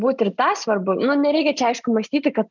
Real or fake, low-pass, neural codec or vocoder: real; 7.2 kHz; none